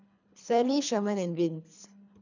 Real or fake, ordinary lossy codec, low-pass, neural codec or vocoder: fake; none; 7.2 kHz; codec, 24 kHz, 3 kbps, HILCodec